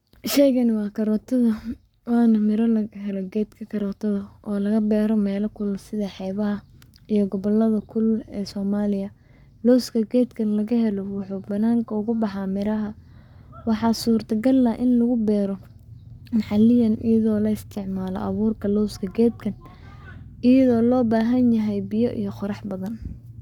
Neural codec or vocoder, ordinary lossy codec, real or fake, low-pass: codec, 44.1 kHz, 7.8 kbps, Pupu-Codec; none; fake; 19.8 kHz